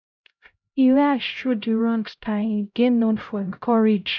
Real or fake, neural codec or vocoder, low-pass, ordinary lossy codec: fake; codec, 16 kHz, 0.5 kbps, X-Codec, HuBERT features, trained on LibriSpeech; 7.2 kHz; none